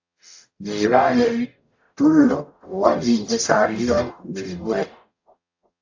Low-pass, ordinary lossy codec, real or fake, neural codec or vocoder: 7.2 kHz; AAC, 48 kbps; fake; codec, 44.1 kHz, 0.9 kbps, DAC